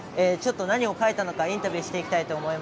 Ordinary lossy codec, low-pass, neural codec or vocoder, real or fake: none; none; none; real